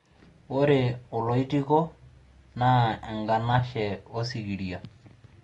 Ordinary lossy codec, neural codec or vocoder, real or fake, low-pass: AAC, 32 kbps; none; real; 10.8 kHz